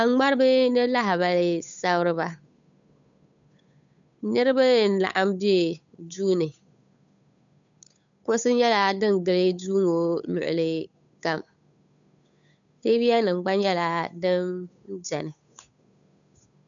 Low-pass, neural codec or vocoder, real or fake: 7.2 kHz; codec, 16 kHz, 8 kbps, FunCodec, trained on Chinese and English, 25 frames a second; fake